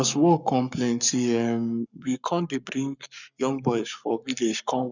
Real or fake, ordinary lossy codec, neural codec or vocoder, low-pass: fake; none; codec, 44.1 kHz, 7.8 kbps, Pupu-Codec; 7.2 kHz